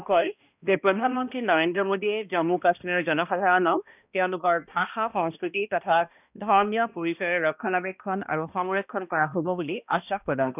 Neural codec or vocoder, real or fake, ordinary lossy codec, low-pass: codec, 16 kHz, 1 kbps, X-Codec, HuBERT features, trained on balanced general audio; fake; none; 3.6 kHz